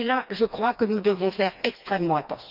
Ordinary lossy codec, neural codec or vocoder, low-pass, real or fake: none; codec, 16 kHz, 2 kbps, FreqCodec, smaller model; 5.4 kHz; fake